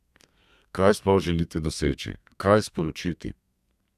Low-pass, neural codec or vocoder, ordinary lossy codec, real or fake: 14.4 kHz; codec, 32 kHz, 1.9 kbps, SNAC; none; fake